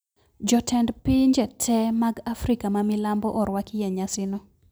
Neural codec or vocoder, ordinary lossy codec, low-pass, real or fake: none; none; none; real